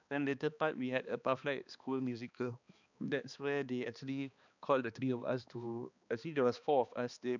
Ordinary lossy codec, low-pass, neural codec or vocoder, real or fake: none; 7.2 kHz; codec, 16 kHz, 2 kbps, X-Codec, HuBERT features, trained on balanced general audio; fake